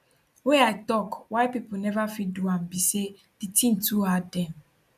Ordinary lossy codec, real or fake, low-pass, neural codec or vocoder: none; real; 14.4 kHz; none